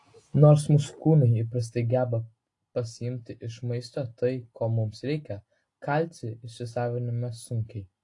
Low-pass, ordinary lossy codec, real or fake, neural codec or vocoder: 10.8 kHz; AAC, 48 kbps; real; none